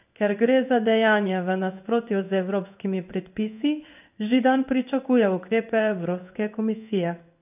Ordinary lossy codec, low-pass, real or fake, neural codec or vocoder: none; 3.6 kHz; fake; codec, 16 kHz in and 24 kHz out, 1 kbps, XY-Tokenizer